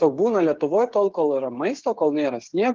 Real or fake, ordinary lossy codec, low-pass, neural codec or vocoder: fake; Opus, 24 kbps; 7.2 kHz; codec, 16 kHz, 8 kbps, FreqCodec, smaller model